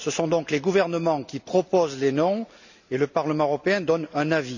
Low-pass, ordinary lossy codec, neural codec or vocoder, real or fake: 7.2 kHz; none; none; real